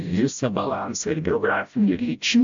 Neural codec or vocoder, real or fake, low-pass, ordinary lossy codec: codec, 16 kHz, 0.5 kbps, FreqCodec, smaller model; fake; 7.2 kHz; MP3, 64 kbps